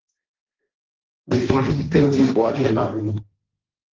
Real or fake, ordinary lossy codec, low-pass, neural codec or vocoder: fake; Opus, 16 kbps; 7.2 kHz; codec, 16 kHz, 1.1 kbps, Voila-Tokenizer